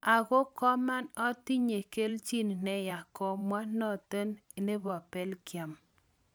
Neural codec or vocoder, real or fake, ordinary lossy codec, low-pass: vocoder, 44.1 kHz, 128 mel bands every 512 samples, BigVGAN v2; fake; none; none